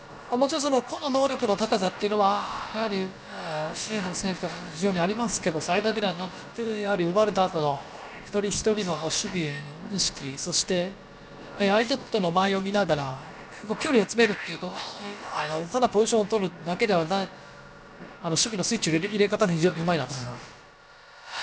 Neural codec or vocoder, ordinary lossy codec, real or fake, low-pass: codec, 16 kHz, about 1 kbps, DyCAST, with the encoder's durations; none; fake; none